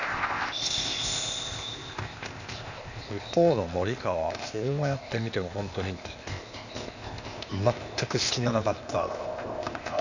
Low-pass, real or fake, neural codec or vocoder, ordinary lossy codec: 7.2 kHz; fake; codec, 16 kHz, 0.8 kbps, ZipCodec; none